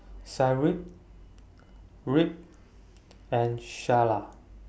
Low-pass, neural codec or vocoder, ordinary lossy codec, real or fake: none; none; none; real